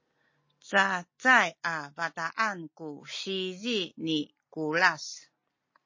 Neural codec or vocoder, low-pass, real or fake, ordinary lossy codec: none; 7.2 kHz; real; MP3, 32 kbps